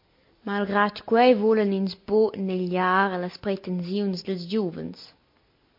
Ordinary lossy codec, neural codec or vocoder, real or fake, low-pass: MP3, 32 kbps; none; real; 5.4 kHz